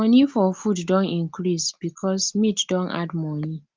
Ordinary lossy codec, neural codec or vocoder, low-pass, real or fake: Opus, 32 kbps; none; 7.2 kHz; real